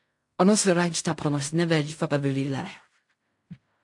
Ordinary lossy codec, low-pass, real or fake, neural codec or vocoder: AAC, 64 kbps; 10.8 kHz; fake; codec, 16 kHz in and 24 kHz out, 0.4 kbps, LongCat-Audio-Codec, fine tuned four codebook decoder